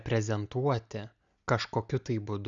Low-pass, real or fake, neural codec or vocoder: 7.2 kHz; real; none